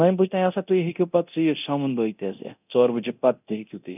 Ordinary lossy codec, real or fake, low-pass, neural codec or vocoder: none; fake; 3.6 kHz; codec, 24 kHz, 0.9 kbps, DualCodec